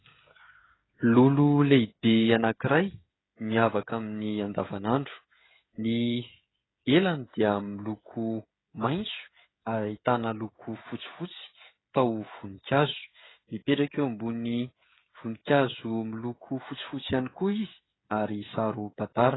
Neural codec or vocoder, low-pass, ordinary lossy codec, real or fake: codec, 16 kHz, 16 kbps, FreqCodec, smaller model; 7.2 kHz; AAC, 16 kbps; fake